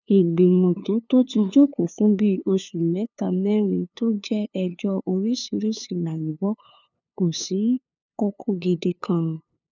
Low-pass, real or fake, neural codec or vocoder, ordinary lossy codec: 7.2 kHz; fake; codec, 16 kHz, 2 kbps, FunCodec, trained on LibriTTS, 25 frames a second; none